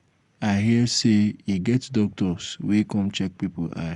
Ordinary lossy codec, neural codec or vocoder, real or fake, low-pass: Opus, 64 kbps; none; real; 10.8 kHz